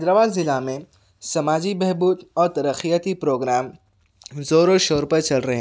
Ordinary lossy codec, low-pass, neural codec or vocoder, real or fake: none; none; none; real